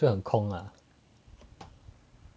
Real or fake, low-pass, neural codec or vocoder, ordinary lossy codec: real; none; none; none